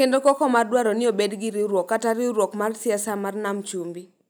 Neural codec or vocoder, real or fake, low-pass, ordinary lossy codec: none; real; none; none